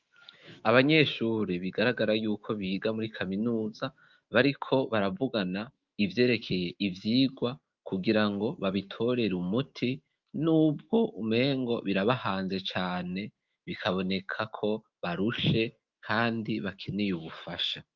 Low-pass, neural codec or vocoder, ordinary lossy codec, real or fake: 7.2 kHz; autoencoder, 48 kHz, 128 numbers a frame, DAC-VAE, trained on Japanese speech; Opus, 24 kbps; fake